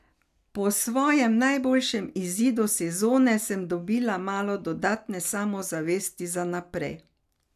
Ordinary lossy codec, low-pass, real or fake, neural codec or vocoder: none; 14.4 kHz; real; none